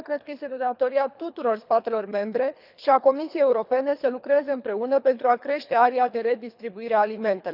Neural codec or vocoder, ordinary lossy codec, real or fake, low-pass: codec, 24 kHz, 3 kbps, HILCodec; none; fake; 5.4 kHz